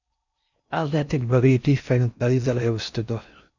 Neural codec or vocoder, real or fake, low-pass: codec, 16 kHz in and 24 kHz out, 0.6 kbps, FocalCodec, streaming, 4096 codes; fake; 7.2 kHz